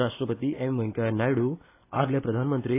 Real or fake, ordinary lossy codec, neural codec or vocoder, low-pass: real; AAC, 24 kbps; none; 3.6 kHz